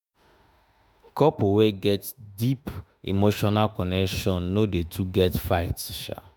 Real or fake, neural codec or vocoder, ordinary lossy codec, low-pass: fake; autoencoder, 48 kHz, 32 numbers a frame, DAC-VAE, trained on Japanese speech; none; none